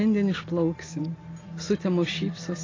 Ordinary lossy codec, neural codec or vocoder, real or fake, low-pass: AAC, 32 kbps; none; real; 7.2 kHz